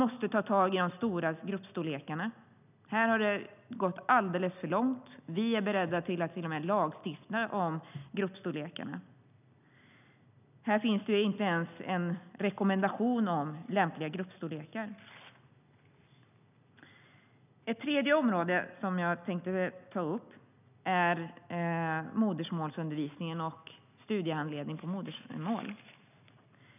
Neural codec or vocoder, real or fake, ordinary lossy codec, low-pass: none; real; none; 3.6 kHz